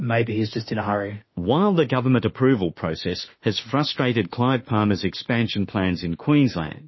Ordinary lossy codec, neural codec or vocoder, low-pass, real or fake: MP3, 24 kbps; autoencoder, 48 kHz, 32 numbers a frame, DAC-VAE, trained on Japanese speech; 7.2 kHz; fake